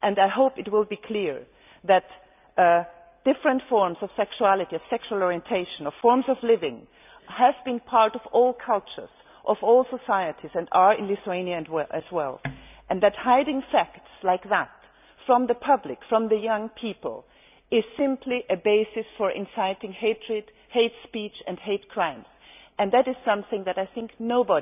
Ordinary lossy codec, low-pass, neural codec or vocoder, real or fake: none; 3.6 kHz; none; real